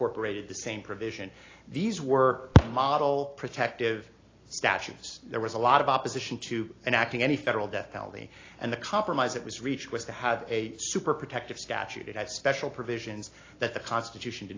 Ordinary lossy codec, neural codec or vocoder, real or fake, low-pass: AAC, 32 kbps; none; real; 7.2 kHz